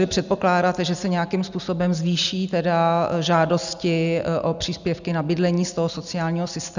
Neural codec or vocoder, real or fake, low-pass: none; real; 7.2 kHz